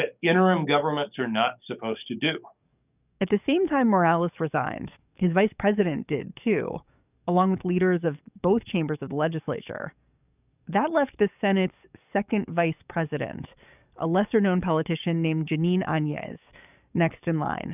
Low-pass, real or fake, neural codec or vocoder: 3.6 kHz; fake; codec, 44.1 kHz, 7.8 kbps, DAC